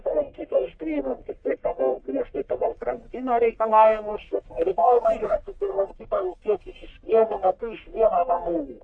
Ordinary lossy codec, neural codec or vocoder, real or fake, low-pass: MP3, 64 kbps; codec, 44.1 kHz, 1.7 kbps, Pupu-Codec; fake; 9.9 kHz